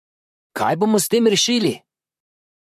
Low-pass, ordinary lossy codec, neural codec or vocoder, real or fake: 14.4 kHz; MP3, 64 kbps; vocoder, 44.1 kHz, 128 mel bands, Pupu-Vocoder; fake